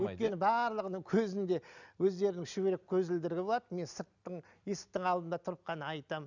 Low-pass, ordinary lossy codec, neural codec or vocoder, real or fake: 7.2 kHz; none; none; real